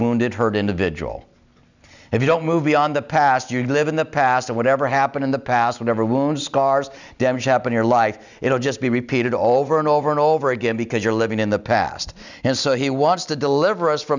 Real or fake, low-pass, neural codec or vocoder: real; 7.2 kHz; none